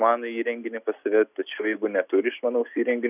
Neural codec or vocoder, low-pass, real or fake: none; 3.6 kHz; real